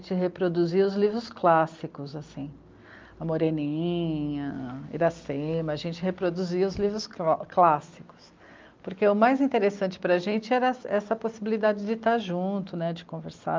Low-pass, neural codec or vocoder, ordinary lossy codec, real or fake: 7.2 kHz; none; Opus, 24 kbps; real